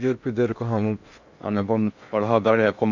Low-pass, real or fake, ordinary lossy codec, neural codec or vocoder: 7.2 kHz; fake; none; codec, 16 kHz in and 24 kHz out, 0.6 kbps, FocalCodec, streaming, 2048 codes